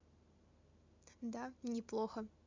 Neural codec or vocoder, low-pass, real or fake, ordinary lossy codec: none; 7.2 kHz; real; MP3, 64 kbps